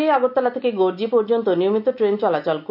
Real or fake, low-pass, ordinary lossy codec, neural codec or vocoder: real; 5.4 kHz; none; none